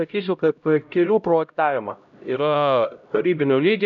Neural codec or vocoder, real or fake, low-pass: codec, 16 kHz, 0.5 kbps, X-Codec, HuBERT features, trained on LibriSpeech; fake; 7.2 kHz